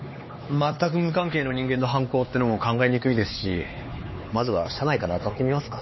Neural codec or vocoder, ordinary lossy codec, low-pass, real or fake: codec, 16 kHz, 4 kbps, X-Codec, HuBERT features, trained on LibriSpeech; MP3, 24 kbps; 7.2 kHz; fake